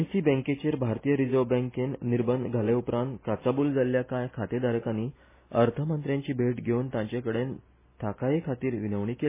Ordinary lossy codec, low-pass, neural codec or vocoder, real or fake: MP3, 16 kbps; 3.6 kHz; none; real